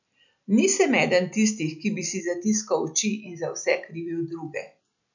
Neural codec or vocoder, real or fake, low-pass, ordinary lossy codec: none; real; 7.2 kHz; none